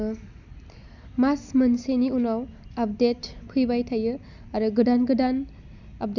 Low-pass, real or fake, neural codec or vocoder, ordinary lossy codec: 7.2 kHz; real; none; none